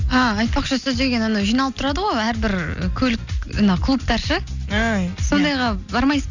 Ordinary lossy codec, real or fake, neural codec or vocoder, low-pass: none; real; none; 7.2 kHz